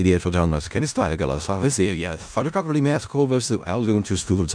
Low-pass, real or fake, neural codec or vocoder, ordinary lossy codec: 9.9 kHz; fake; codec, 16 kHz in and 24 kHz out, 0.4 kbps, LongCat-Audio-Codec, four codebook decoder; MP3, 96 kbps